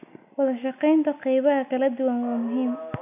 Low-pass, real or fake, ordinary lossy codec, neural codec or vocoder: 3.6 kHz; real; none; none